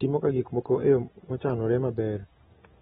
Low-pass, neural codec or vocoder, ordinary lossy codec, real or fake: 19.8 kHz; none; AAC, 16 kbps; real